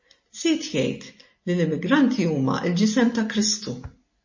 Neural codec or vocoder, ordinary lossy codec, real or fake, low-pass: none; MP3, 32 kbps; real; 7.2 kHz